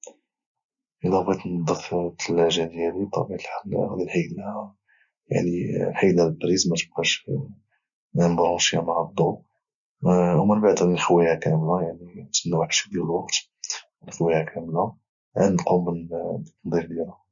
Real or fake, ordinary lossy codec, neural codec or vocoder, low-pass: real; none; none; 7.2 kHz